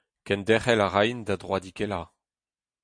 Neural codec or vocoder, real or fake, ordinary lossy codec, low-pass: none; real; MP3, 64 kbps; 9.9 kHz